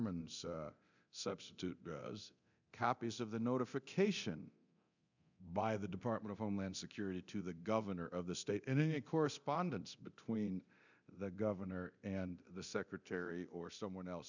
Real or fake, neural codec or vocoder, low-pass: fake; codec, 24 kHz, 0.9 kbps, DualCodec; 7.2 kHz